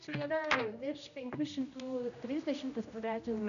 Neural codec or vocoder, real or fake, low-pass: codec, 16 kHz, 1 kbps, X-Codec, HuBERT features, trained on general audio; fake; 7.2 kHz